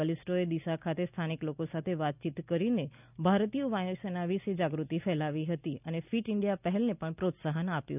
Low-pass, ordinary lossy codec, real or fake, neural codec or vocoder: 3.6 kHz; none; real; none